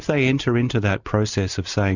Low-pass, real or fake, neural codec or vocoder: 7.2 kHz; real; none